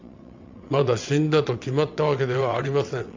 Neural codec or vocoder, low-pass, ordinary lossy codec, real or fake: vocoder, 22.05 kHz, 80 mel bands, WaveNeXt; 7.2 kHz; MP3, 64 kbps; fake